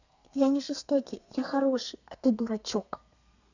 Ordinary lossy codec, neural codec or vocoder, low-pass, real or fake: none; codec, 32 kHz, 1.9 kbps, SNAC; 7.2 kHz; fake